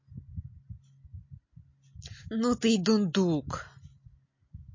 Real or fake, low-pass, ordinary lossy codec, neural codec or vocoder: real; 7.2 kHz; MP3, 32 kbps; none